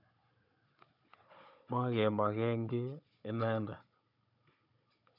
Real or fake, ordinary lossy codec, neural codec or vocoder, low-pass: fake; none; codec, 16 kHz, 16 kbps, FunCodec, trained on Chinese and English, 50 frames a second; 5.4 kHz